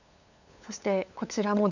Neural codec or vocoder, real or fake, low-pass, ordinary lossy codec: codec, 16 kHz, 8 kbps, FunCodec, trained on LibriTTS, 25 frames a second; fake; 7.2 kHz; none